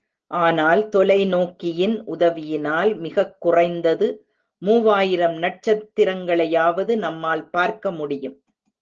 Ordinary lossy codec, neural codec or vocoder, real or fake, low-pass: Opus, 16 kbps; none; real; 7.2 kHz